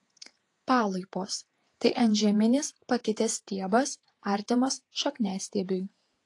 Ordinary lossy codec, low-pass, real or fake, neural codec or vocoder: AAC, 48 kbps; 10.8 kHz; fake; vocoder, 48 kHz, 128 mel bands, Vocos